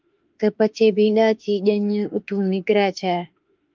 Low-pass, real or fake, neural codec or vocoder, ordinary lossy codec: 7.2 kHz; fake; autoencoder, 48 kHz, 32 numbers a frame, DAC-VAE, trained on Japanese speech; Opus, 32 kbps